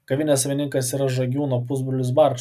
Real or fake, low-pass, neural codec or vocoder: real; 14.4 kHz; none